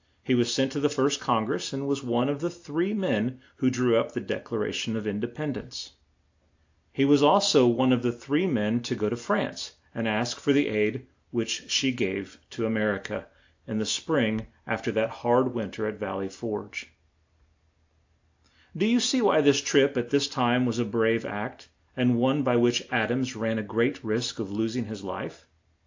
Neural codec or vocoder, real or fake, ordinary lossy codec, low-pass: none; real; AAC, 48 kbps; 7.2 kHz